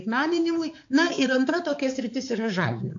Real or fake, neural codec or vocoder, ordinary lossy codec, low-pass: fake; codec, 16 kHz, 4 kbps, X-Codec, HuBERT features, trained on balanced general audio; AAC, 48 kbps; 7.2 kHz